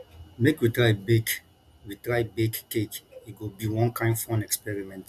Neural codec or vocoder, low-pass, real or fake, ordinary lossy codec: none; 14.4 kHz; real; none